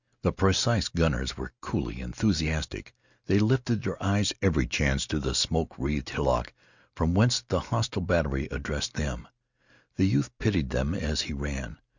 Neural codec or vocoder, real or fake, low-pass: none; real; 7.2 kHz